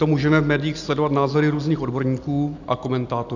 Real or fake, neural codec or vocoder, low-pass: real; none; 7.2 kHz